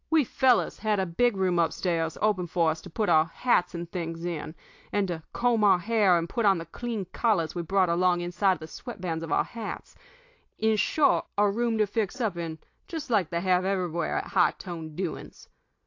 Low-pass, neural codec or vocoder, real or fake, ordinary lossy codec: 7.2 kHz; none; real; AAC, 48 kbps